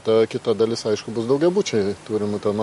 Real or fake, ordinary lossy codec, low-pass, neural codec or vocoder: real; MP3, 48 kbps; 14.4 kHz; none